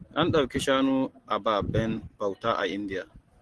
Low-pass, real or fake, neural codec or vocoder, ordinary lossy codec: 10.8 kHz; real; none; Opus, 16 kbps